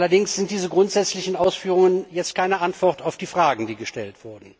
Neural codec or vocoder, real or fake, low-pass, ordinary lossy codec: none; real; none; none